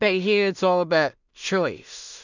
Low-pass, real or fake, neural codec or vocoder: 7.2 kHz; fake; codec, 16 kHz in and 24 kHz out, 0.4 kbps, LongCat-Audio-Codec, two codebook decoder